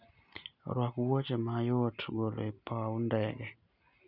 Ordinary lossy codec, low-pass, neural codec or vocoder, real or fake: none; 5.4 kHz; none; real